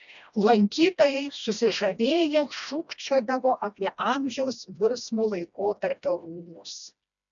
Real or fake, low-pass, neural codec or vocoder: fake; 7.2 kHz; codec, 16 kHz, 1 kbps, FreqCodec, smaller model